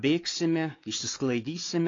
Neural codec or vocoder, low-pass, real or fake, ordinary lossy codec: codec, 16 kHz, 4 kbps, X-Codec, WavLM features, trained on Multilingual LibriSpeech; 7.2 kHz; fake; AAC, 32 kbps